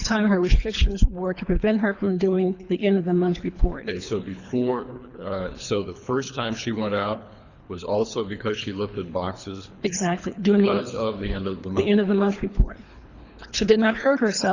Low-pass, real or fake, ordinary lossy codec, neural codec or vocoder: 7.2 kHz; fake; Opus, 64 kbps; codec, 24 kHz, 3 kbps, HILCodec